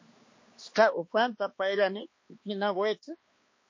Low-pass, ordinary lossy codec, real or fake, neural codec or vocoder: 7.2 kHz; MP3, 32 kbps; fake; codec, 16 kHz, 2 kbps, X-Codec, HuBERT features, trained on balanced general audio